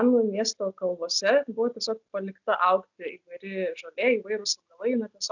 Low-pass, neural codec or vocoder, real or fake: 7.2 kHz; none; real